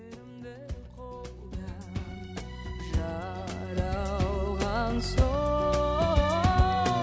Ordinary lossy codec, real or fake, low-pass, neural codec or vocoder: none; real; none; none